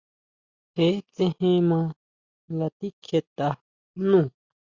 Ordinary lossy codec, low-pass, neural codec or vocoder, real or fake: Opus, 64 kbps; 7.2 kHz; none; real